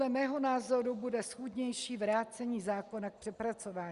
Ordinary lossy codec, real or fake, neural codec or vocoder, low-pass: Opus, 64 kbps; real; none; 10.8 kHz